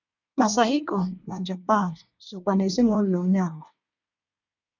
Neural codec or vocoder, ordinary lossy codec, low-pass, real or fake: codec, 24 kHz, 1 kbps, SNAC; none; 7.2 kHz; fake